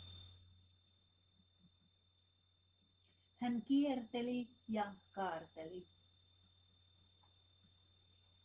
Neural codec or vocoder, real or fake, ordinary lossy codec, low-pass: none; real; Opus, 16 kbps; 3.6 kHz